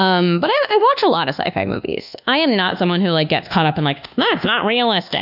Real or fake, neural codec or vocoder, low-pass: fake; codec, 24 kHz, 1.2 kbps, DualCodec; 5.4 kHz